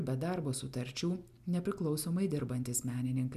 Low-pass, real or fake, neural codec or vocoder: 14.4 kHz; real; none